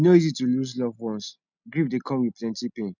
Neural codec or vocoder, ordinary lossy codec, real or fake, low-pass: none; none; real; 7.2 kHz